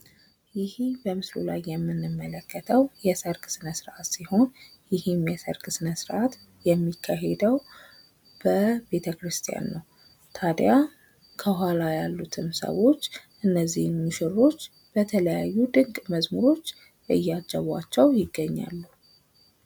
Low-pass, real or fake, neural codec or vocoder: 19.8 kHz; real; none